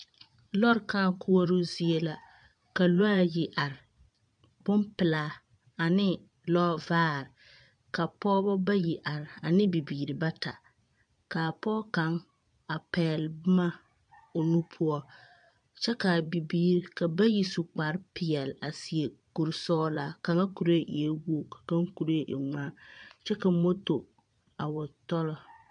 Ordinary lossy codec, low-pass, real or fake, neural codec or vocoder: MP3, 64 kbps; 9.9 kHz; fake; vocoder, 22.05 kHz, 80 mel bands, Vocos